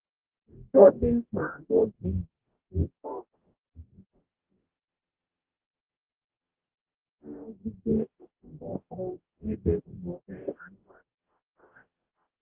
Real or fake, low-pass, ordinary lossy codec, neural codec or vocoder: fake; 3.6 kHz; Opus, 16 kbps; codec, 44.1 kHz, 0.9 kbps, DAC